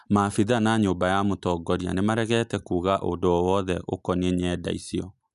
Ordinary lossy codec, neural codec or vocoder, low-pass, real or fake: none; none; 10.8 kHz; real